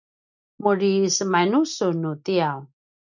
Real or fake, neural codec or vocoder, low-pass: real; none; 7.2 kHz